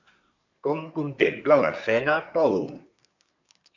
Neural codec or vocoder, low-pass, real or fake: codec, 24 kHz, 1 kbps, SNAC; 7.2 kHz; fake